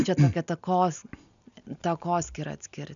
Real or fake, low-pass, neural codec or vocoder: real; 7.2 kHz; none